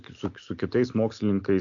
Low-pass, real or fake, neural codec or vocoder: 7.2 kHz; real; none